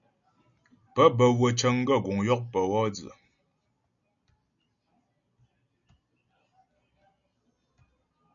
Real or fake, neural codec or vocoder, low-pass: real; none; 7.2 kHz